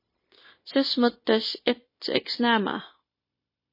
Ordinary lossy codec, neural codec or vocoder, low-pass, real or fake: MP3, 24 kbps; codec, 16 kHz, 0.9 kbps, LongCat-Audio-Codec; 5.4 kHz; fake